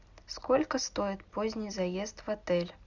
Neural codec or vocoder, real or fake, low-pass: none; real; 7.2 kHz